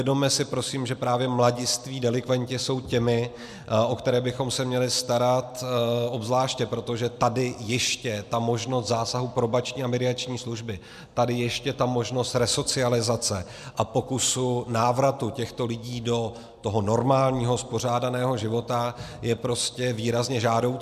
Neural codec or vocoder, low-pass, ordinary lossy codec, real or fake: none; 14.4 kHz; MP3, 96 kbps; real